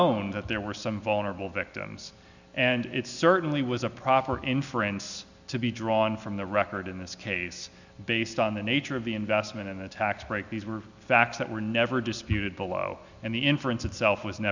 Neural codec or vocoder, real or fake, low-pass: none; real; 7.2 kHz